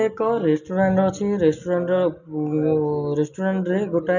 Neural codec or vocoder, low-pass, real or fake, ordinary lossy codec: none; 7.2 kHz; real; none